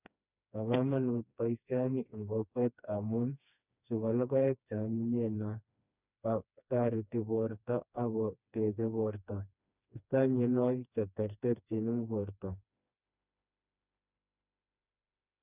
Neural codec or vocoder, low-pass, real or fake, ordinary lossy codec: codec, 16 kHz, 2 kbps, FreqCodec, smaller model; 3.6 kHz; fake; none